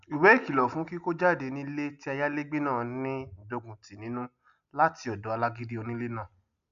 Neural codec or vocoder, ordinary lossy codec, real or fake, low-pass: none; none; real; 7.2 kHz